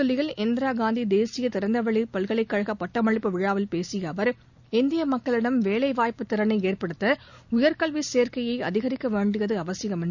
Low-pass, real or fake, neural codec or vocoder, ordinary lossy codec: 7.2 kHz; real; none; none